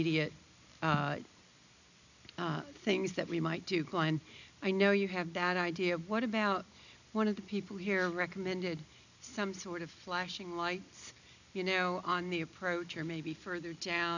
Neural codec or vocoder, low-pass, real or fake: none; 7.2 kHz; real